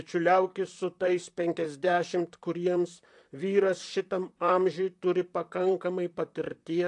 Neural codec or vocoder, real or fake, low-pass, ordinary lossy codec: vocoder, 44.1 kHz, 128 mel bands, Pupu-Vocoder; fake; 10.8 kHz; AAC, 64 kbps